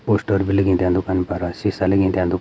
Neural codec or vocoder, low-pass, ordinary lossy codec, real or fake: none; none; none; real